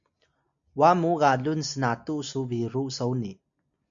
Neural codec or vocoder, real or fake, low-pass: none; real; 7.2 kHz